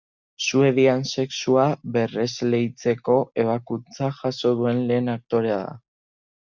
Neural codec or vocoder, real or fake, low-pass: none; real; 7.2 kHz